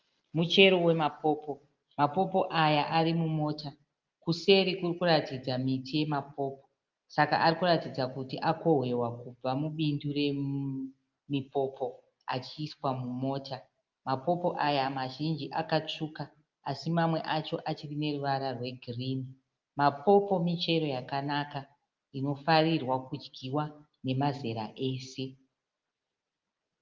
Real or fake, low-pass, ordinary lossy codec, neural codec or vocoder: real; 7.2 kHz; Opus, 32 kbps; none